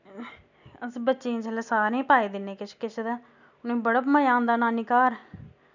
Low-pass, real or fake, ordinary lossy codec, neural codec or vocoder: 7.2 kHz; real; none; none